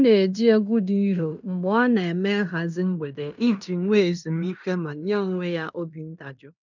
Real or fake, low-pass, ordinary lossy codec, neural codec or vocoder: fake; 7.2 kHz; none; codec, 16 kHz in and 24 kHz out, 0.9 kbps, LongCat-Audio-Codec, fine tuned four codebook decoder